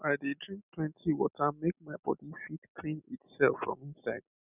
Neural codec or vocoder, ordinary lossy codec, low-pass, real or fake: none; none; 3.6 kHz; real